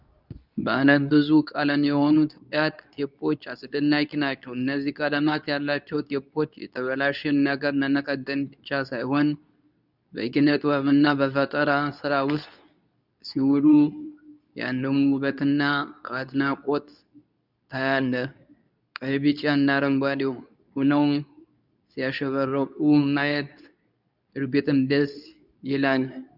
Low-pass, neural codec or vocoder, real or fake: 5.4 kHz; codec, 24 kHz, 0.9 kbps, WavTokenizer, medium speech release version 2; fake